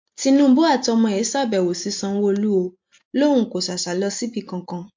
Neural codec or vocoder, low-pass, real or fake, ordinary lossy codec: none; 7.2 kHz; real; MP3, 48 kbps